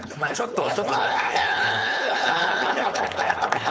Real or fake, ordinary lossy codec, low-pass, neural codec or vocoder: fake; none; none; codec, 16 kHz, 4.8 kbps, FACodec